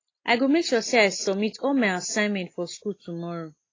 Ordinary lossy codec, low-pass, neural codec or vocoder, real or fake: AAC, 32 kbps; 7.2 kHz; none; real